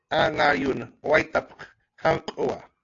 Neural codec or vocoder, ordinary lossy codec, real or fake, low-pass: none; AAC, 48 kbps; real; 7.2 kHz